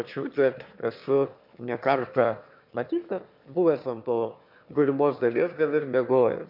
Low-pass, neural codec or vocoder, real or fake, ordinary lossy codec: 5.4 kHz; autoencoder, 22.05 kHz, a latent of 192 numbers a frame, VITS, trained on one speaker; fake; MP3, 48 kbps